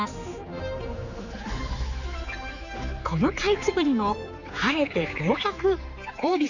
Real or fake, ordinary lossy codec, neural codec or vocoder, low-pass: fake; none; codec, 16 kHz, 4 kbps, X-Codec, HuBERT features, trained on general audio; 7.2 kHz